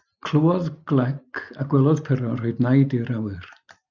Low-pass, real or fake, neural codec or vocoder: 7.2 kHz; real; none